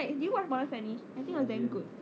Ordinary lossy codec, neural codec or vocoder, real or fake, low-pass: none; none; real; none